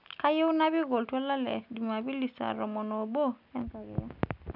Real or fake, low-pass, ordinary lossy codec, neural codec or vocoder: real; 5.4 kHz; none; none